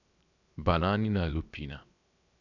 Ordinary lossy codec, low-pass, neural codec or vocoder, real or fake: none; 7.2 kHz; codec, 16 kHz, 0.7 kbps, FocalCodec; fake